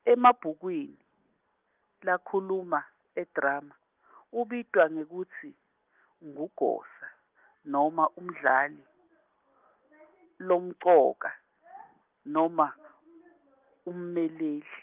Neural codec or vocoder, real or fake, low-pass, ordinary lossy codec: none; real; 3.6 kHz; Opus, 24 kbps